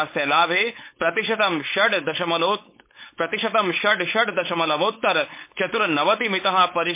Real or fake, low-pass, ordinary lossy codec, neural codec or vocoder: fake; 3.6 kHz; MP3, 24 kbps; codec, 16 kHz, 4.8 kbps, FACodec